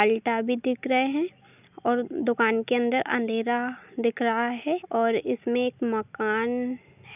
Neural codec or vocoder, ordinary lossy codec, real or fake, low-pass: none; none; real; 3.6 kHz